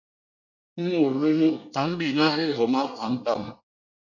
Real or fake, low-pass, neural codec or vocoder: fake; 7.2 kHz; codec, 24 kHz, 1 kbps, SNAC